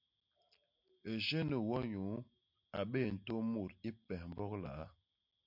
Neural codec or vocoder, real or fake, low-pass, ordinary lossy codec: none; real; 5.4 kHz; MP3, 48 kbps